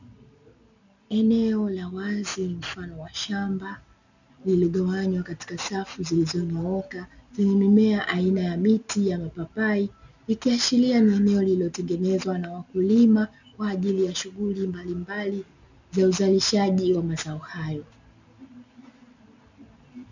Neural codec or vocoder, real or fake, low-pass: none; real; 7.2 kHz